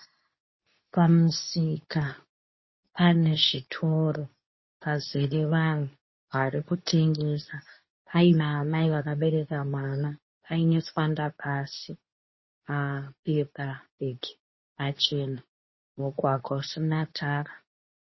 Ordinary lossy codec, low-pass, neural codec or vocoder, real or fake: MP3, 24 kbps; 7.2 kHz; codec, 24 kHz, 0.9 kbps, WavTokenizer, medium speech release version 2; fake